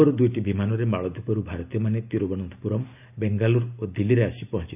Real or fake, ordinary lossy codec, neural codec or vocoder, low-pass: real; none; none; 3.6 kHz